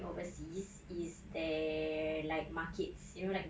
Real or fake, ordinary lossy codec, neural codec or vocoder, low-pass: real; none; none; none